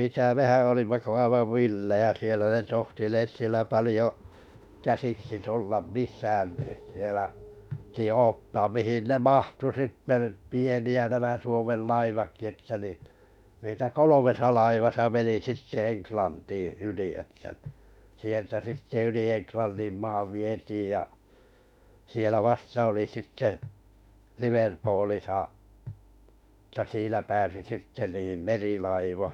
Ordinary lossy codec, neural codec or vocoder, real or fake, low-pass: none; autoencoder, 48 kHz, 32 numbers a frame, DAC-VAE, trained on Japanese speech; fake; 19.8 kHz